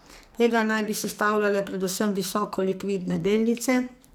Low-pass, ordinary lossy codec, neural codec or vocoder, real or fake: none; none; codec, 44.1 kHz, 2.6 kbps, SNAC; fake